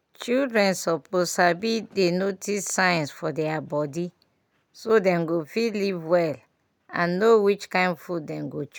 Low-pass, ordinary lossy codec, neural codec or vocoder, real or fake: none; none; none; real